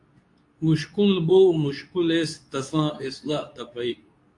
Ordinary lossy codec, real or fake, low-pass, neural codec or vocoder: AAC, 64 kbps; fake; 10.8 kHz; codec, 24 kHz, 0.9 kbps, WavTokenizer, medium speech release version 2